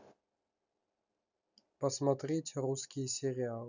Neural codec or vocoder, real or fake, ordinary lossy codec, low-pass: none; real; none; 7.2 kHz